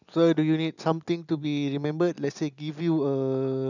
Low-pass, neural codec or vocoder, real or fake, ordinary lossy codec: 7.2 kHz; none; real; none